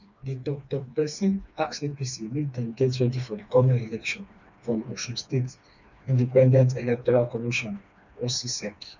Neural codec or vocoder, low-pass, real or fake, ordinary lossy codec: codec, 16 kHz, 2 kbps, FreqCodec, smaller model; 7.2 kHz; fake; none